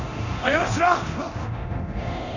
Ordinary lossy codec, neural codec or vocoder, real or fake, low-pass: none; codec, 24 kHz, 0.9 kbps, DualCodec; fake; 7.2 kHz